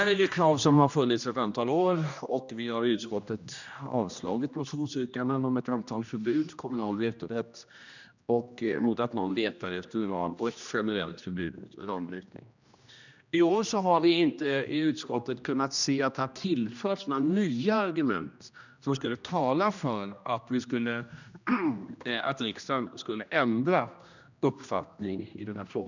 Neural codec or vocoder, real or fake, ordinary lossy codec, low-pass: codec, 16 kHz, 1 kbps, X-Codec, HuBERT features, trained on general audio; fake; none; 7.2 kHz